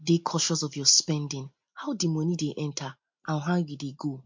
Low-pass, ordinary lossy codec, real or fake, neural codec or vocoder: 7.2 kHz; MP3, 48 kbps; real; none